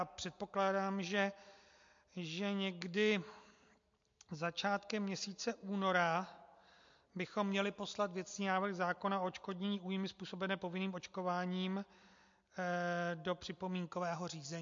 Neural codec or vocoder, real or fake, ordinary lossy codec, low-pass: none; real; MP3, 48 kbps; 7.2 kHz